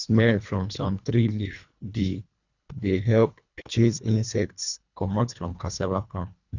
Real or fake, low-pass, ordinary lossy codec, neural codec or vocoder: fake; 7.2 kHz; none; codec, 24 kHz, 1.5 kbps, HILCodec